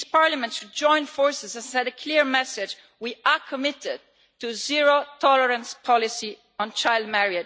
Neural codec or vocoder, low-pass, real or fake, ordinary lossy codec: none; none; real; none